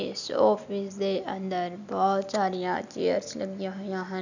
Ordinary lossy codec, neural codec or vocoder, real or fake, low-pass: none; none; real; 7.2 kHz